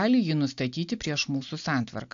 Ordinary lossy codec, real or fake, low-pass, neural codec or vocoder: AAC, 64 kbps; real; 7.2 kHz; none